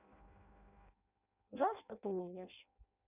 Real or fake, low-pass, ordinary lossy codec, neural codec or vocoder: fake; 3.6 kHz; none; codec, 16 kHz in and 24 kHz out, 0.6 kbps, FireRedTTS-2 codec